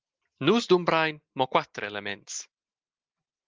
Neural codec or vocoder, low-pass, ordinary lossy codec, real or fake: none; 7.2 kHz; Opus, 32 kbps; real